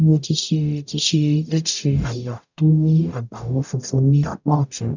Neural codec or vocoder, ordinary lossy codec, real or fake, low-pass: codec, 44.1 kHz, 0.9 kbps, DAC; none; fake; 7.2 kHz